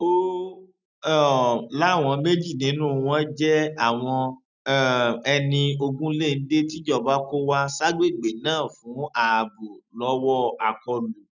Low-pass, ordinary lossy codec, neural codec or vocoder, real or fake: 7.2 kHz; none; none; real